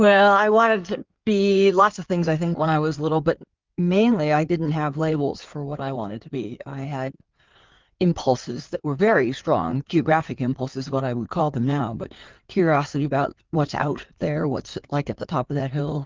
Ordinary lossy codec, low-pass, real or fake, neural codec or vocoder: Opus, 24 kbps; 7.2 kHz; fake; codec, 16 kHz in and 24 kHz out, 2.2 kbps, FireRedTTS-2 codec